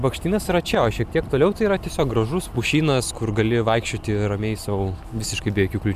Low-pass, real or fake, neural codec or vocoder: 14.4 kHz; real; none